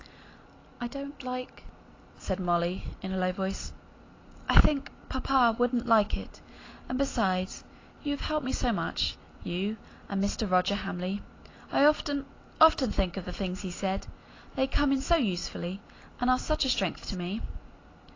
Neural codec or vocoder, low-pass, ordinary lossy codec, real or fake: none; 7.2 kHz; AAC, 32 kbps; real